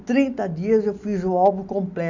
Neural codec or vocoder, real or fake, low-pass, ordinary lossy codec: none; real; 7.2 kHz; none